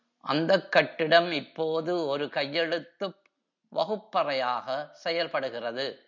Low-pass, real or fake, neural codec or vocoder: 7.2 kHz; real; none